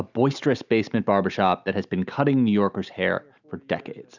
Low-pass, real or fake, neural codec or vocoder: 7.2 kHz; real; none